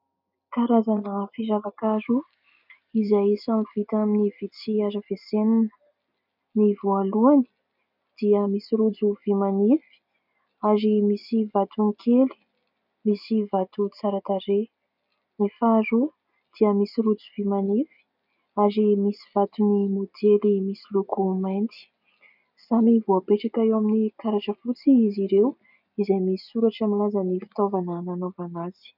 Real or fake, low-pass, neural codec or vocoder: real; 5.4 kHz; none